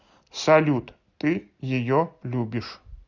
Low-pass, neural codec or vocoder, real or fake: 7.2 kHz; none; real